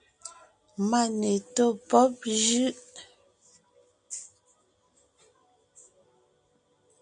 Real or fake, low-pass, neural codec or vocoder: real; 9.9 kHz; none